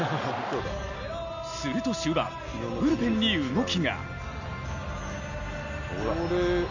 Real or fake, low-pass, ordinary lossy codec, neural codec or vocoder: real; 7.2 kHz; none; none